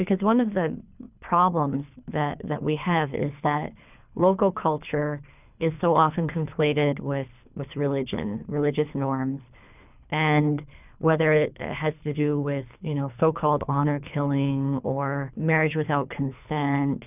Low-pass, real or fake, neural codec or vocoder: 3.6 kHz; fake; codec, 24 kHz, 3 kbps, HILCodec